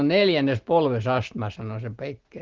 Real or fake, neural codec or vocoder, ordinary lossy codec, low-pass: real; none; Opus, 16 kbps; 7.2 kHz